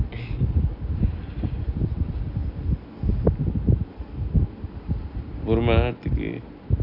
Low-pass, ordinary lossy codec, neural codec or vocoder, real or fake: 5.4 kHz; none; none; real